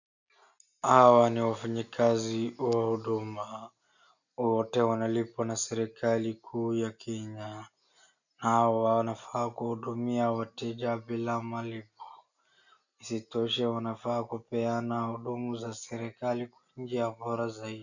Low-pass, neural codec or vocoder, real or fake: 7.2 kHz; none; real